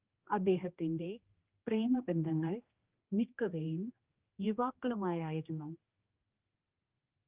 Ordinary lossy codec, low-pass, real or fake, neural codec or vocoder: Opus, 32 kbps; 3.6 kHz; fake; codec, 16 kHz, 1 kbps, X-Codec, HuBERT features, trained on general audio